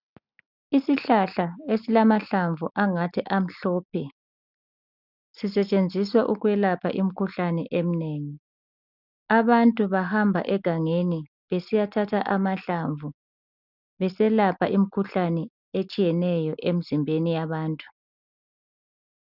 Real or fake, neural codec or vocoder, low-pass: real; none; 5.4 kHz